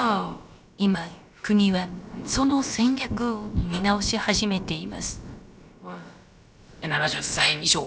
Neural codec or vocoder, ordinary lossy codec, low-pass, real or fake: codec, 16 kHz, about 1 kbps, DyCAST, with the encoder's durations; none; none; fake